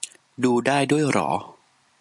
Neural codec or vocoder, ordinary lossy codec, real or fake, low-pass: none; MP3, 96 kbps; real; 10.8 kHz